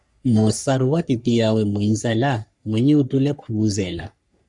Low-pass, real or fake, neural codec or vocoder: 10.8 kHz; fake; codec, 44.1 kHz, 3.4 kbps, Pupu-Codec